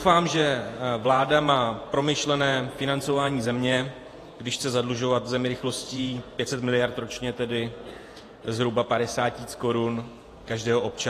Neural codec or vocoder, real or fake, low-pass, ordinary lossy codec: vocoder, 48 kHz, 128 mel bands, Vocos; fake; 14.4 kHz; AAC, 48 kbps